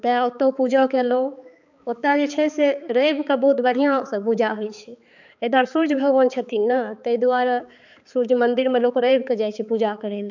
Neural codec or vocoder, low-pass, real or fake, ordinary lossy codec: codec, 16 kHz, 4 kbps, X-Codec, HuBERT features, trained on balanced general audio; 7.2 kHz; fake; none